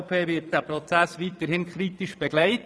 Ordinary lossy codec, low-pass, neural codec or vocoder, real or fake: none; none; vocoder, 22.05 kHz, 80 mel bands, Vocos; fake